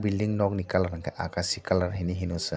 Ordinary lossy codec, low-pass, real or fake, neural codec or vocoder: none; none; real; none